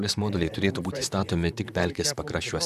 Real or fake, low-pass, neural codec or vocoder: fake; 14.4 kHz; vocoder, 44.1 kHz, 128 mel bands, Pupu-Vocoder